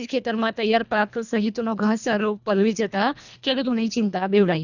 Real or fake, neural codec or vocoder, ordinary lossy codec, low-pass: fake; codec, 24 kHz, 1.5 kbps, HILCodec; none; 7.2 kHz